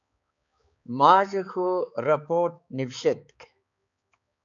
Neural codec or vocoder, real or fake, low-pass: codec, 16 kHz, 4 kbps, X-Codec, HuBERT features, trained on balanced general audio; fake; 7.2 kHz